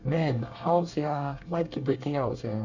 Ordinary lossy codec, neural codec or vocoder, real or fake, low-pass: Opus, 64 kbps; codec, 24 kHz, 1 kbps, SNAC; fake; 7.2 kHz